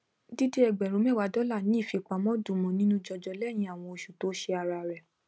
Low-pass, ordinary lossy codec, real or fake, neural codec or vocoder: none; none; real; none